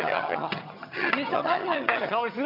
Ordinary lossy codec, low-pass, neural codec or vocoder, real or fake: AAC, 48 kbps; 5.4 kHz; vocoder, 22.05 kHz, 80 mel bands, HiFi-GAN; fake